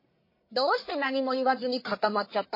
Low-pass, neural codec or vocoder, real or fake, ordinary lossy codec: 5.4 kHz; codec, 44.1 kHz, 1.7 kbps, Pupu-Codec; fake; MP3, 24 kbps